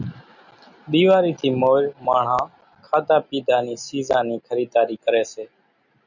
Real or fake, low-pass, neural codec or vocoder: real; 7.2 kHz; none